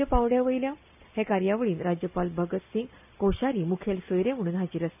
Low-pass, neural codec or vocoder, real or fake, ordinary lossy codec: 3.6 kHz; none; real; none